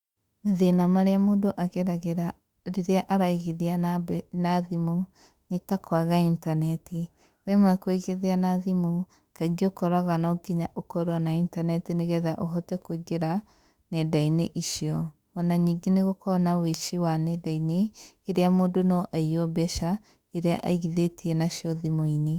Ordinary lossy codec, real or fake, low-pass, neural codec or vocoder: Opus, 64 kbps; fake; 19.8 kHz; autoencoder, 48 kHz, 32 numbers a frame, DAC-VAE, trained on Japanese speech